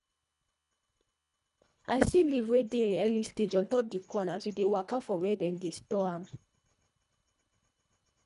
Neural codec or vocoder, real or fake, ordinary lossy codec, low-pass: codec, 24 kHz, 1.5 kbps, HILCodec; fake; none; 10.8 kHz